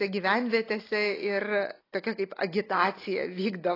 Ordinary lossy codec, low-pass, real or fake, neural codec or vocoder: AAC, 24 kbps; 5.4 kHz; real; none